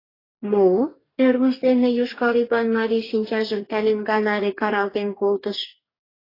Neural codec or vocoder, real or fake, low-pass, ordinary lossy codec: codec, 44.1 kHz, 2.6 kbps, DAC; fake; 5.4 kHz; AAC, 24 kbps